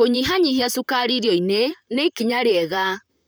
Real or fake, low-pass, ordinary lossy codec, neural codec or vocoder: fake; none; none; vocoder, 44.1 kHz, 128 mel bands, Pupu-Vocoder